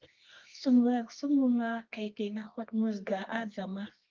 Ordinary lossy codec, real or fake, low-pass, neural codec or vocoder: Opus, 24 kbps; fake; 7.2 kHz; codec, 24 kHz, 0.9 kbps, WavTokenizer, medium music audio release